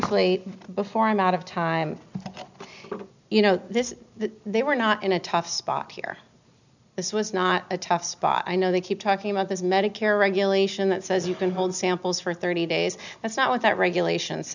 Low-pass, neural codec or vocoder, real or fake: 7.2 kHz; none; real